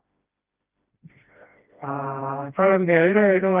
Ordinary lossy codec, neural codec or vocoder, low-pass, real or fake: Opus, 32 kbps; codec, 16 kHz, 1 kbps, FreqCodec, smaller model; 3.6 kHz; fake